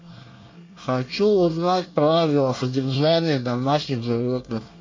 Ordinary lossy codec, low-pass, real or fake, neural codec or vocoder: MP3, 48 kbps; 7.2 kHz; fake; codec, 24 kHz, 1 kbps, SNAC